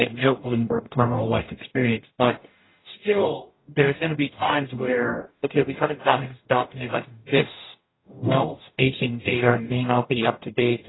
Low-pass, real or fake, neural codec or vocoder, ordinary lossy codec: 7.2 kHz; fake; codec, 44.1 kHz, 0.9 kbps, DAC; AAC, 16 kbps